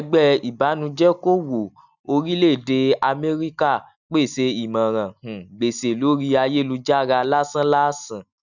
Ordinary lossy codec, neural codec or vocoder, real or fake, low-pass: none; none; real; 7.2 kHz